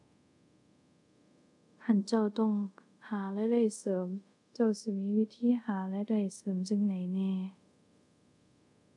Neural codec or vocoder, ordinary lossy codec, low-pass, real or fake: codec, 24 kHz, 0.5 kbps, DualCodec; none; 10.8 kHz; fake